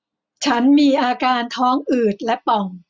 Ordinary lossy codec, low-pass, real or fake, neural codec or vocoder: none; none; real; none